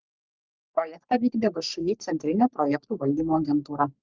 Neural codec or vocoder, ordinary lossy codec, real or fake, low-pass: codec, 44.1 kHz, 3.4 kbps, Pupu-Codec; Opus, 24 kbps; fake; 7.2 kHz